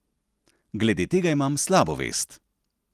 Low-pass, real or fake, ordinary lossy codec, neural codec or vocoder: 14.4 kHz; real; Opus, 24 kbps; none